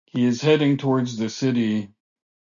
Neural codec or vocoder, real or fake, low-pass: none; real; 7.2 kHz